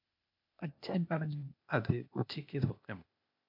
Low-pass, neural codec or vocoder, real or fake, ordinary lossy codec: 5.4 kHz; codec, 16 kHz, 0.8 kbps, ZipCodec; fake; MP3, 48 kbps